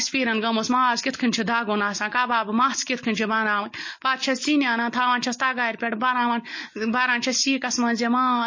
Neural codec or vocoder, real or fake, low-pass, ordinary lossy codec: none; real; 7.2 kHz; MP3, 32 kbps